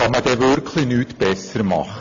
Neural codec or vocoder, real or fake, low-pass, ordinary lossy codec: none; real; 7.2 kHz; AAC, 48 kbps